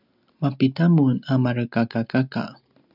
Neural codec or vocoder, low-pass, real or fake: none; 5.4 kHz; real